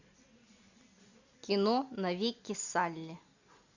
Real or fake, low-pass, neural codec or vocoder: real; 7.2 kHz; none